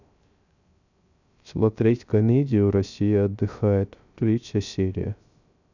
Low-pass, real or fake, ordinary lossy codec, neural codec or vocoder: 7.2 kHz; fake; none; codec, 16 kHz, 0.3 kbps, FocalCodec